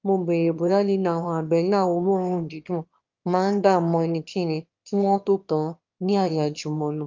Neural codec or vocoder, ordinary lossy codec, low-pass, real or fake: autoencoder, 22.05 kHz, a latent of 192 numbers a frame, VITS, trained on one speaker; Opus, 24 kbps; 7.2 kHz; fake